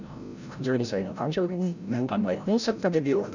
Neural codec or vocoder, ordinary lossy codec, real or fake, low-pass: codec, 16 kHz, 0.5 kbps, FreqCodec, larger model; none; fake; 7.2 kHz